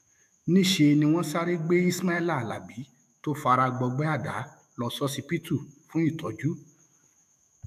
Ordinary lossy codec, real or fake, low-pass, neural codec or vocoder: none; fake; 14.4 kHz; autoencoder, 48 kHz, 128 numbers a frame, DAC-VAE, trained on Japanese speech